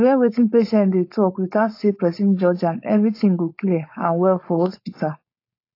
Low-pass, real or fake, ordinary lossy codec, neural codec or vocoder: 5.4 kHz; fake; AAC, 32 kbps; codec, 16 kHz, 4.8 kbps, FACodec